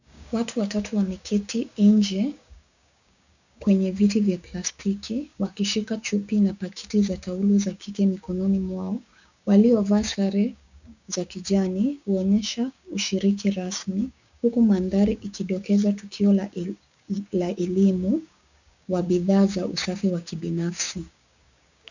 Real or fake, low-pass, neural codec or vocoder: fake; 7.2 kHz; codec, 16 kHz, 6 kbps, DAC